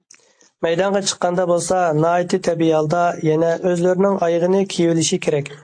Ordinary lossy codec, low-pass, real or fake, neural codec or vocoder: AAC, 48 kbps; 9.9 kHz; real; none